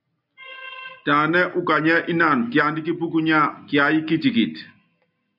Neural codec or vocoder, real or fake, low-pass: none; real; 5.4 kHz